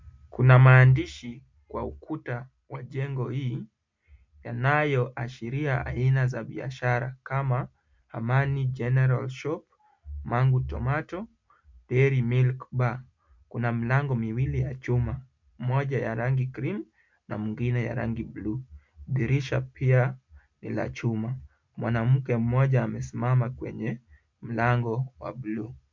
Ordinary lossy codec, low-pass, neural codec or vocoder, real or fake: MP3, 64 kbps; 7.2 kHz; none; real